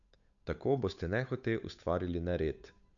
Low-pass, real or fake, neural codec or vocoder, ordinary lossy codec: 7.2 kHz; fake; codec, 16 kHz, 8 kbps, FunCodec, trained on Chinese and English, 25 frames a second; none